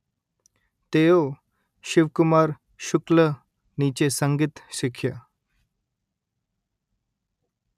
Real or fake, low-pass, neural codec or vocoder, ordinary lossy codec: real; 14.4 kHz; none; none